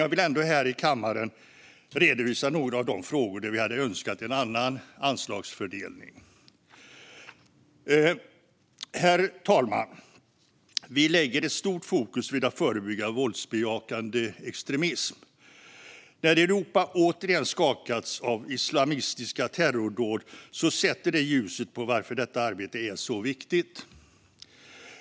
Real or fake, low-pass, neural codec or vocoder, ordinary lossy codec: real; none; none; none